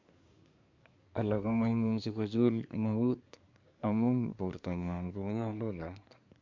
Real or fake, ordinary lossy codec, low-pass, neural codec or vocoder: fake; MP3, 64 kbps; 7.2 kHz; codec, 24 kHz, 1 kbps, SNAC